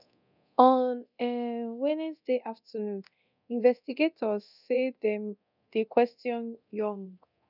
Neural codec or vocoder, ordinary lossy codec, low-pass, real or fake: codec, 24 kHz, 0.9 kbps, DualCodec; none; 5.4 kHz; fake